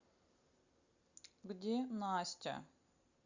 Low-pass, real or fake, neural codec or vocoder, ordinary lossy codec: 7.2 kHz; real; none; Opus, 64 kbps